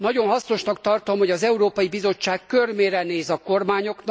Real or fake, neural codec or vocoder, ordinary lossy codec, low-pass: real; none; none; none